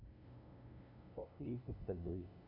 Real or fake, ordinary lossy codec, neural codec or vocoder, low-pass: fake; none; codec, 16 kHz, 0.5 kbps, FunCodec, trained on LibriTTS, 25 frames a second; 5.4 kHz